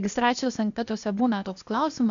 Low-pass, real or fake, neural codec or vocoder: 7.2 kHz; fake; codec, 16 kHz, 0.8 kbps, ZipCodec